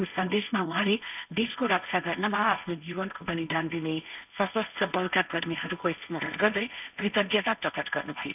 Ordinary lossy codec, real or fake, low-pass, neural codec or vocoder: none; fake; 3.6 kHz; codec, 16 kHz, 1.1 kbps, Voila-Tokenizer